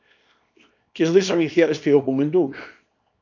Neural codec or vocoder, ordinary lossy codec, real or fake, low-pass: codec, 24 kHz, 0.9 kbps, WavTokenizer, small release; AAC, 48 kbps; fake; 7.2 kHz